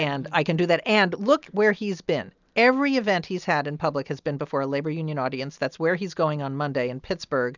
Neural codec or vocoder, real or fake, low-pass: none; real; 7.2 kHz